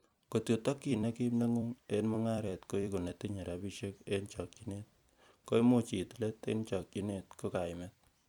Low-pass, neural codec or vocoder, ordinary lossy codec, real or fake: 19.8 kHz; vocoder, 44.1 kHz, 128 mel bands every 256 samples, BigVGAN v2; none; fake